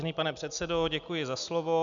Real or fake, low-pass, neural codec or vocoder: real; 7.2 kHz; none